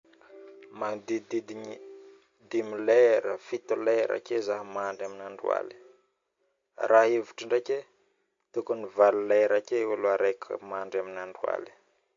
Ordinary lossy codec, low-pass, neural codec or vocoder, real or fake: MP3, 48 kbps; 7.2 kHz; none; real